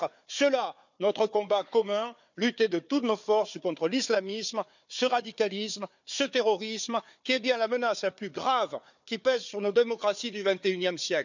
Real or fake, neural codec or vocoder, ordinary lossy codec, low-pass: fake; codec, 16 kHz, 4 kbps, FunCodec, trained on Chinese and English, 50 frames a second; none; 7.2 kHz